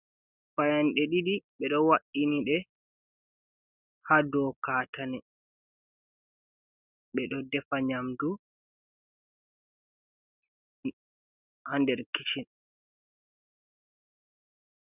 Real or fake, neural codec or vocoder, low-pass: real; none; 3.6 kHz